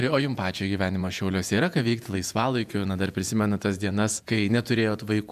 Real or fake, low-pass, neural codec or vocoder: fake; 14.4 kHz; vocoder, 48 kHz, 128 mel bands, Vocos